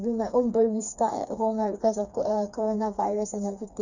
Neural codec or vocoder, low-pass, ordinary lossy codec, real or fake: codec, 16 kHz, 4 kbps, FreqCodec, smaller model; 7.2 kHz; none; fake